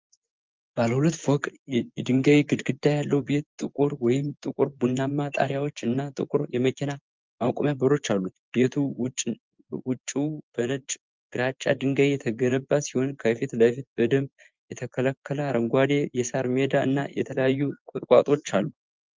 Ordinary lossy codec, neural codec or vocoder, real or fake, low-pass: Opus, 32 kbps; vocoder, 24 kHz, 100 mel bands, Vocos; fake; 7.2 kHz